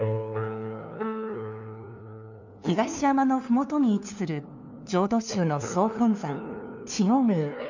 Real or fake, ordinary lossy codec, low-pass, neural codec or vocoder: fake; none; 7.2 kHz; codec, 16 kHz, 2 kbps, FunCodec, trained on LibriTTS, 25 frames a second